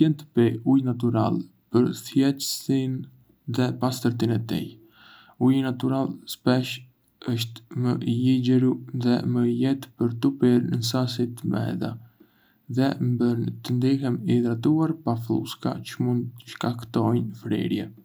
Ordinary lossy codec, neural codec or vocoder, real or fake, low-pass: none; none; real; none